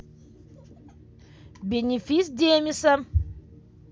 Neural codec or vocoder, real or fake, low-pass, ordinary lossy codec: none; real; none; none